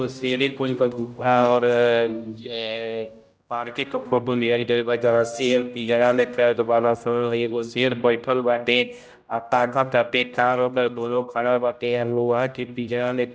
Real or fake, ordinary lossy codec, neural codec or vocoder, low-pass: fake; none; codec, 16 kHz, 0.5 kbps, X-Codec, HuBERT features, trained on general audio; none